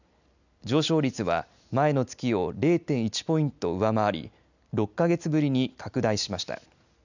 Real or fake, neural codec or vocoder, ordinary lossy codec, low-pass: real; none; none; 7.2 kHz